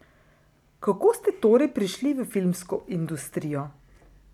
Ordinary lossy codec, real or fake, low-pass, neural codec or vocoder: none; real; 19.8 kHz; none